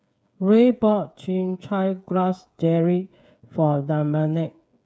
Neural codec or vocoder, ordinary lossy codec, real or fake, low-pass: codec, 16 kHz, 8 kbps, FreqCodec, smaller model; none; fake; none